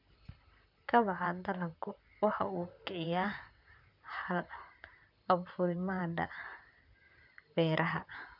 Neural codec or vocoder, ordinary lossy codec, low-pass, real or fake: vocoder, 44.1 kHz, 128 mel bands, Pupu-Vocoder; none; 5.4 kHz; fake